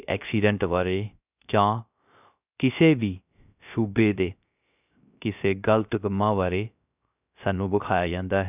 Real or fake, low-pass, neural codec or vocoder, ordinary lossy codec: fake; 3.6 kHz; codec, 16 kHz, 0.3 kbps, FocalCodec; none